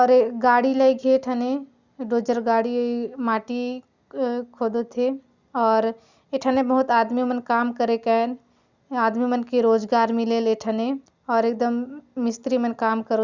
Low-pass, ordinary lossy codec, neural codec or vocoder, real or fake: 7.2 kHz; Opus, 64 kbps; none; real